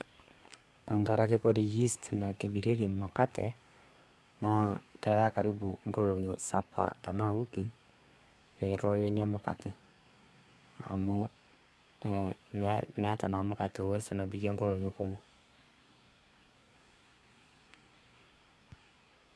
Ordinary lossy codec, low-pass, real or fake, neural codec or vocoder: none; none; fake; codec, 24 kHz, 1 kbps, SNAC